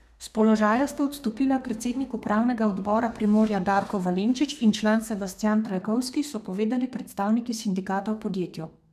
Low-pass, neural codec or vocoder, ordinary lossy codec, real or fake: 14.4 kHz; codec, 32 kHz, 1.9 kbps, SNAC; none; fake